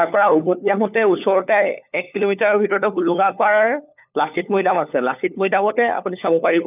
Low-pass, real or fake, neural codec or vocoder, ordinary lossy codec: 3.6 kHz; fake; codec, 16 kHz, 4 kbps, FunCodec, trained on LibriTTS, 50 frames a second; none